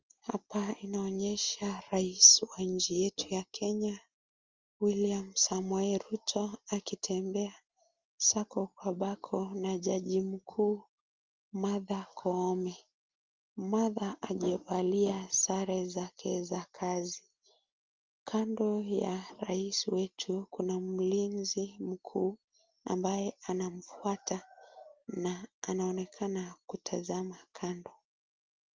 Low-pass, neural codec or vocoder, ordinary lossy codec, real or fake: 7.2 kHz; none; Opus, 32 kbps; real